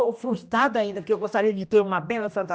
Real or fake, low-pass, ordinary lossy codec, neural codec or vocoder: fake; none; none; codec, 16 kHz, 1 kbps, X-Codec, HuBERT features, trained on general audio